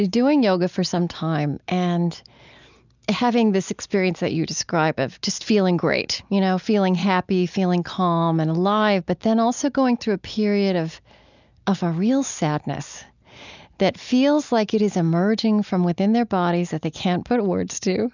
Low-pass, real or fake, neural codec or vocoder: 7.2 kHz; real; none